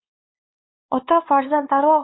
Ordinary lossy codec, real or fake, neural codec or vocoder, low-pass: AAC, 16 kbps; fake; codec, 16 kHz, 4 kbps, X-Codec, WavLM features, trained on Multilingual LibriSpeech; 7.2 kHz